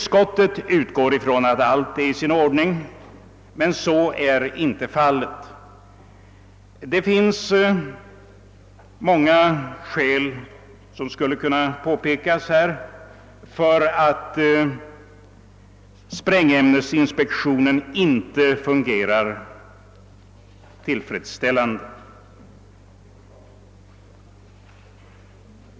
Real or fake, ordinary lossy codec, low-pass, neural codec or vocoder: real; none; none; none